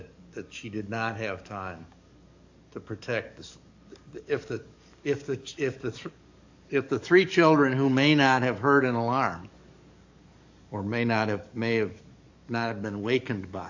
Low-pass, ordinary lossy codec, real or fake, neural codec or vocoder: 7.2 kHz; AAC, 48 kbps; fake; codec, 44.1 kHz, 7.8 kbps, DAC